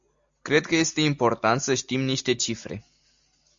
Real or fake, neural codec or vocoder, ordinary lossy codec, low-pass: real; none; MP3, 64 kbps; 7.2 kHz